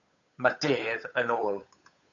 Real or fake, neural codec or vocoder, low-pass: fake; codec, 16 kHz, 8 kbps, FunCodec, trained on Chinese and English, 25 frames a second; 7.2 kHz